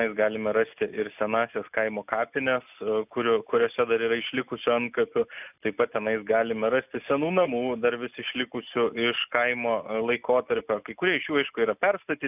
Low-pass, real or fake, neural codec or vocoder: 3.6 kHz; real; none